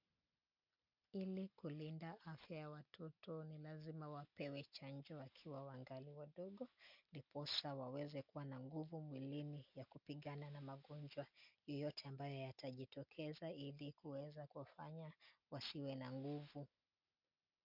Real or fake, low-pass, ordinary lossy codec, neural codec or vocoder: real; 5.4 kHz; MP3, 48 kbps; none